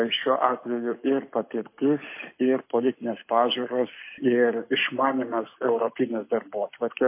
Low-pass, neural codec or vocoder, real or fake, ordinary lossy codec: 3.6 kHz; codec, 44.1 kHz, 7.8 kbps, Pupu-Codec; fake; MP3, 24 kbps